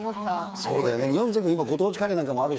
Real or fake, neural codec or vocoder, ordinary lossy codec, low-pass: fake; codec, 16 kHz, 4 kbps, FreqCodec, smaller model; none; none